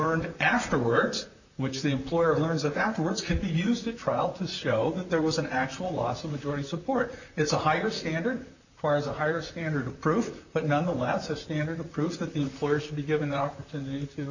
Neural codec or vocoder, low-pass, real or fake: vocoder, 44.1 kHz, 128 mel bands, Pupu-Vocoder; 7.2 kHz; fake